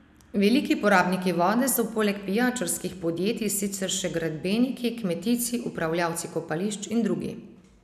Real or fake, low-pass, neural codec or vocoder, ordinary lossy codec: real; 14.4 kHz; none; none